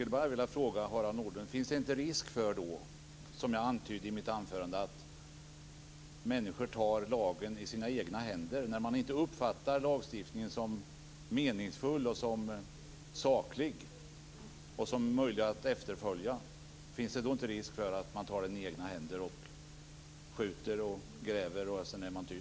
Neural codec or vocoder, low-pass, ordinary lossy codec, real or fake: none; none; none; real